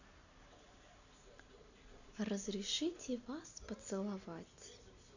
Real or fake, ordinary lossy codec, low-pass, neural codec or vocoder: real; none; 7.2 kHz; none